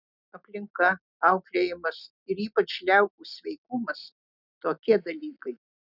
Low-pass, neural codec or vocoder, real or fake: 5.4 kHz; none; real